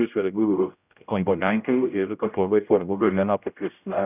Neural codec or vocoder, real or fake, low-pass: codec, 16 kHz, 0.5 kbps, X-Codec, HuBERT features, trained on general audio; fake; 3.6 kHz